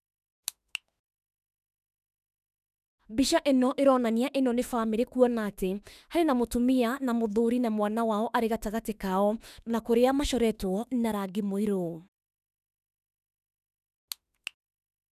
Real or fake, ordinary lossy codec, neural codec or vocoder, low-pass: fake; none; autoencoder, 48 kHz, 32 numbers a frame, DAC-VAE, trained on Japanese speech; 14.4 kHz